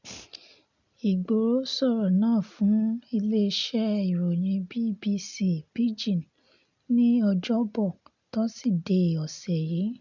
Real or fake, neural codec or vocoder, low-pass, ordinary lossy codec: real; none; 7.2 kHz; none